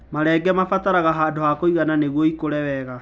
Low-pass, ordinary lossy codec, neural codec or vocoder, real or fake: none; none; none; real